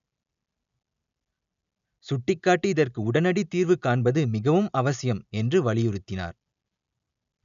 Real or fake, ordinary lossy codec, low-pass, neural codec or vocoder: real; none; 7.2 kHz; none